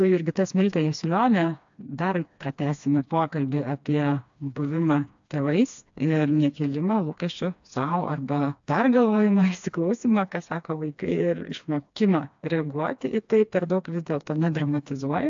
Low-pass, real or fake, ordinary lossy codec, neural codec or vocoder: 7.2 kHz; fake; AAC, 64 kbps; codec, 16 kHz, 2 kbps, FreqCodec, smaller model